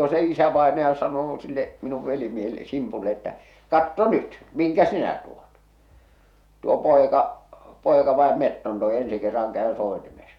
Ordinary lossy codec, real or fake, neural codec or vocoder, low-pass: none; fake; vocoder, 48 kHz, 128 mel bands, Vocos; 19.8 kHz